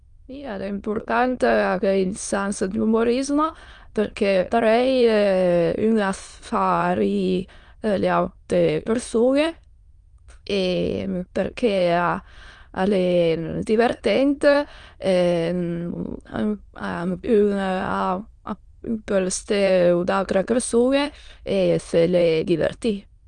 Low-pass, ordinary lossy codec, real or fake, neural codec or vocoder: 9.9 kHz; Opus, 32 kbps; fake; autoencoder, 22.05 kHz, a latent of 192 numbers a frame, VITS, trained on many speakers